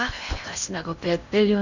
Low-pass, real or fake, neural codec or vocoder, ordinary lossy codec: 7.2 kHz; fake; codec, 16 kHz in and 24 kHz out, 0.8 kbps, FocalCodec, streaming, 65536 codes; none